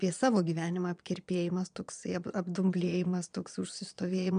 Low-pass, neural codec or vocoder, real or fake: 9.9 kHz; vocoder, 22.05 kHz, 80 mel bands, Vocos; fake